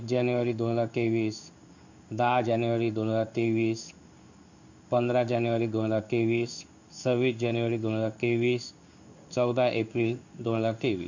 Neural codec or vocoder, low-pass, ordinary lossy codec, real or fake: codec, 16 kHz in and 24 kHz out, 1 kbps, XY-Tokenizer; 7.2 kHz; none; fake